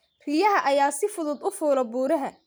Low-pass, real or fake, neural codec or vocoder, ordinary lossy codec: none; real; none; none